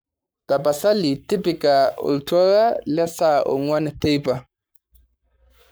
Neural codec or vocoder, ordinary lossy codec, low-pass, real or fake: codec, 44.1 kHz, 7.8 kbps, Pupu-Codec; none; none; fake